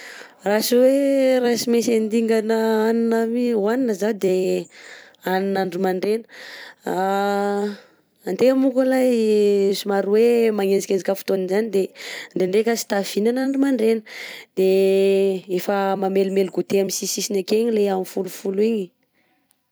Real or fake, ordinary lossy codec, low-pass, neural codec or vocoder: fake; none; none; vocoder, 44.1 kHz, 128 mel bands every 256 samples, BigVGAN v2